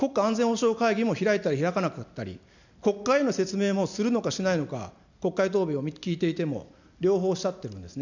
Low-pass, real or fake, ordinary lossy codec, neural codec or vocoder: 7.2 kHz; real; none; none